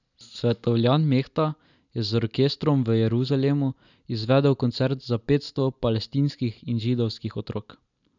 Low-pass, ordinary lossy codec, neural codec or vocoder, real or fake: 7.2 kHz; none; none; real